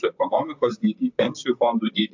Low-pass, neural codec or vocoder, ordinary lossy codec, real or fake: 7.2 kHz; vocoder, 44.1 kHz, 128 mel bands, Pupu-Vocoder; AAC, 48 kbps; fake